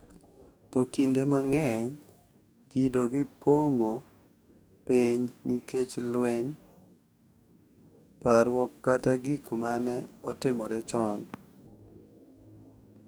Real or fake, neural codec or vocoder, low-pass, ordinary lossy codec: fake; codec, 44.1 kHz, 2.6 kbps, DAC; none; none